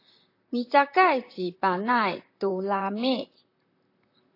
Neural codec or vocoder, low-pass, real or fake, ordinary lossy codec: vocoder, 44.1 kHz, 128 mel bands, Pupu-Vocoder; 5.4 kHz; fake; AAC, 32 kbps